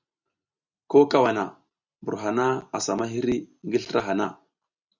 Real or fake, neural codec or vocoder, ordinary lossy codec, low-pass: real; none; Opus, 64 kbps; 7.2 kHz